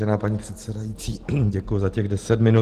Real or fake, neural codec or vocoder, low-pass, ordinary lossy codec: real; none; 14.4 kHz; Opus, 16 kbps